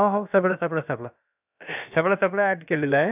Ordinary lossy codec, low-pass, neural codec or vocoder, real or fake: none; 3.6 kHz; codec, 16 kHz, about 1 kbps, DyCAST, with the encoder's durations; fake